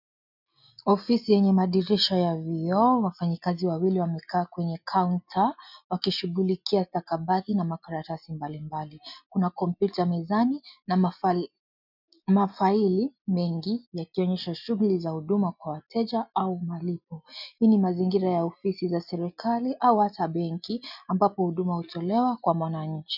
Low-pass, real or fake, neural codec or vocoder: 5.4 kHz; real; none